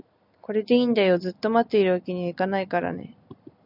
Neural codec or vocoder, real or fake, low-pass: none; real; 5.4 kHz